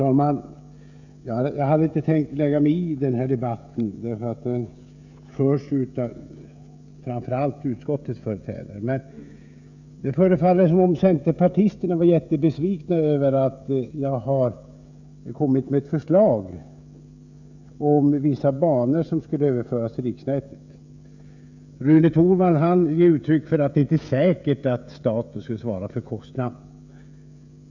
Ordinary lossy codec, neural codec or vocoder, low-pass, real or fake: none; codec, 16 kHz, 16 kbps, FreqCodec, smaller model; 7.2 kHz; fake